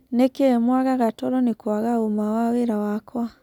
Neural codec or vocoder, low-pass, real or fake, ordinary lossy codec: none; 19.8 kHz; real; none